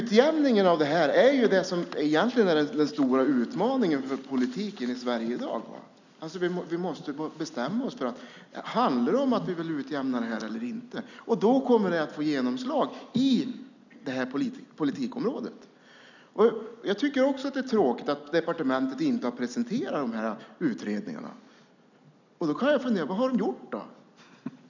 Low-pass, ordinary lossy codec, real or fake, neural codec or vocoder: 7.2 kHz; none; real; none